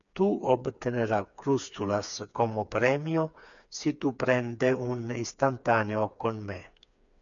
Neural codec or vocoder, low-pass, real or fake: codec, 16 kHz, 4 kbps, FreqCodec, smaller model; 7.2 kHz; fake